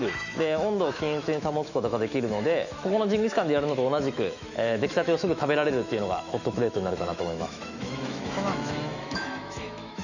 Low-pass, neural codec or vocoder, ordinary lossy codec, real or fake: 7.2 kHz; autoencoder, 48 kHz, 128 numbers a frame, DAC-VAE, trained on Japanese speech; none; fake